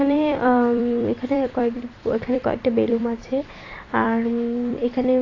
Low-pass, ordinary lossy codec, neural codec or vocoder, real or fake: 7.2 kHz; AAC, 32 kbps; vocoder, 44.1 kHz, 128 mel bands every 256 samples, BigVGAN v2; fake